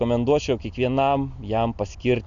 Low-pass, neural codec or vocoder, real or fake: 7.2 kHz; none; real